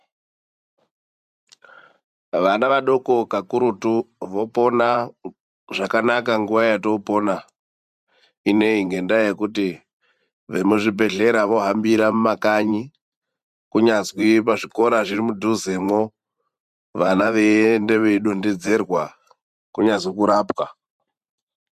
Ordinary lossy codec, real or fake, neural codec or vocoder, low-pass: MP3, 96 kbps; fake; vocoder, 44.1 kHz, 128 mel bands every 512 samples, BigVGAN v2; 14.4 kHz